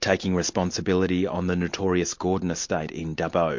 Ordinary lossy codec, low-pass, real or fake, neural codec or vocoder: MP3, 48 kbps; 7.2 kHz; real; none